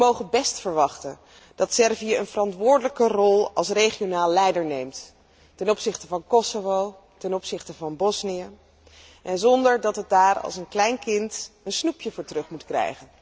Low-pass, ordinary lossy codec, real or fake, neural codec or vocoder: none; none; real; none